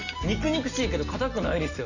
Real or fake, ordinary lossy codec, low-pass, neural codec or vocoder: real; none; 7.2 kHz; none